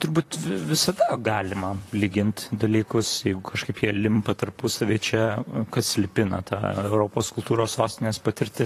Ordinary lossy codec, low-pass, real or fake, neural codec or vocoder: AAC, 48 kbps; 14.4 kHz; fake; vocoder, 44.1 kHz, 128 mel bands, Pupu-Vocoder